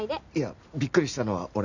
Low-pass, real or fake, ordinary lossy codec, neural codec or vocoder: 7.2 kHz; real; none; none